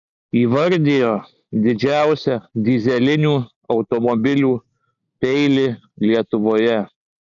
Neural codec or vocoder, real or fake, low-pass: none; real; 7.2 kHz